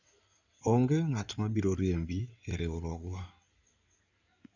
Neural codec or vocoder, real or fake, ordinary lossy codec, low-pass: codec, 16 kHz in and 24 kHz out, 2.2 kbps, FireRedTTS-2 codec; fake; none; 7.2 kHz